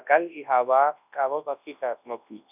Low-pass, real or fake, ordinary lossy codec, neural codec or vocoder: 3.6 kHz; fake; none; codec, 24 kHz, 0.9 kbps, WavTokenizer, large speech release